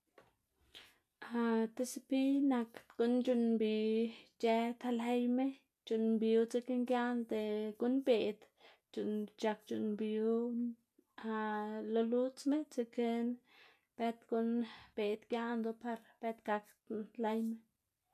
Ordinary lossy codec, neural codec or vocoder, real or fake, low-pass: none; none; real; 14.4 kHz